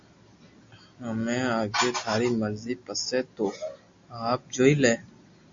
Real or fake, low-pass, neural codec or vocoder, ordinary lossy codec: real; 7.2 kHz; none; MP3, 48 kbps